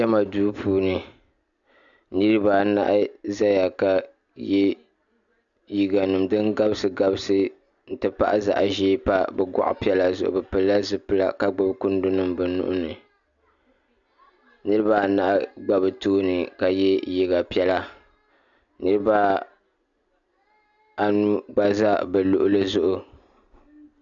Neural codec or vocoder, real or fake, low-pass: none; real; 7.2 kHz